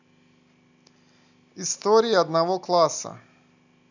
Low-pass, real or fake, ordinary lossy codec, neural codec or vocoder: 7.2 kHz; real; none; none